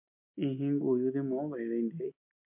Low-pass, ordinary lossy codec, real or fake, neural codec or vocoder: 3.6 kHz; MP3, 32 kbps; real; none